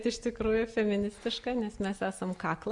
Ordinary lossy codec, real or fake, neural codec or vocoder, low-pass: MP3, 64 kbps; real; none; 10.8 kHz